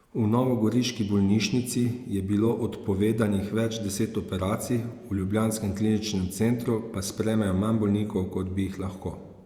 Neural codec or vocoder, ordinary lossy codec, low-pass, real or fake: vocoder, 48 kHz, 128 mel bands, Vocos; Opus, 64 kbps; 19.8 kHz; fake